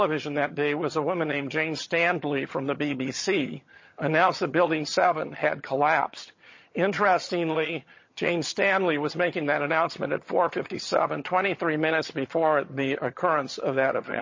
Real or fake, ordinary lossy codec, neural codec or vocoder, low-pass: fake; MP3, 32 kbps; vocoder, 22.05 kHz, 80 mel bands, HiFi-GAN; 7.2 kHz